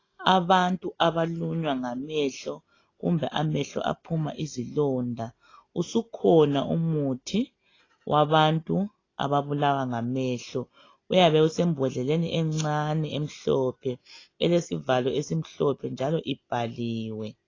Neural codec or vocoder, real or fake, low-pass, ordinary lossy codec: none; real; 7.2 kHz; AAC, 32 kbps